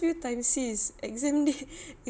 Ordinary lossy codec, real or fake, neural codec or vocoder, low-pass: none; real; none; none